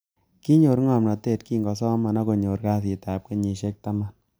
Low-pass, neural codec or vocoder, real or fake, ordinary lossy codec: none; none; real; none